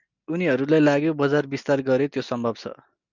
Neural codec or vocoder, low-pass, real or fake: none; 7.2 kHz; real